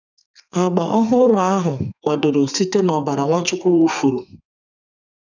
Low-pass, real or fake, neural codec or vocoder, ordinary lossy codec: 7.2 kHz; fake; codec, 32 kHz, 1.9 kbps, SNAC; none